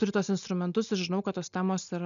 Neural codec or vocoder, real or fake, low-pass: none; real; 7.2 kHz